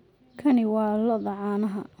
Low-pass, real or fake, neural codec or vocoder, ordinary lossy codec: 19.8 kHz; real; none; none